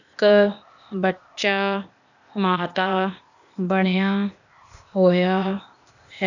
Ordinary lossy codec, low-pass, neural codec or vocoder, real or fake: none; 7.2 kHz; codec, 16 kHz, 0.8 kbps, ZipCodec; fake